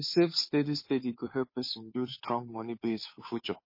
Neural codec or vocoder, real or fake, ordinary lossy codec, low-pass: codec, 16 kHz, 2 kbps, FunCodec, trained on Chinese and English, 25 frames a second; fake; MP3, 24 kbps; 5.4 kHz